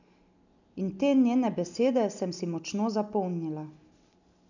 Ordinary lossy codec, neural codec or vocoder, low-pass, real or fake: none; none; 7.2 kHz; real